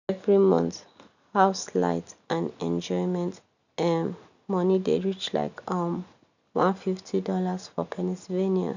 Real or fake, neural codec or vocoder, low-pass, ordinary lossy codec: real; none; 7.2 kHz; none